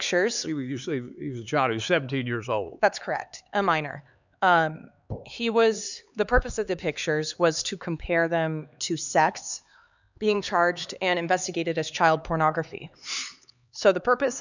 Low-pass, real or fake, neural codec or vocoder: 7.2 kHz; fake; codec, 16 kHz, 4 kbps, X-Codec, HuBERT features, trained on LibriSpeech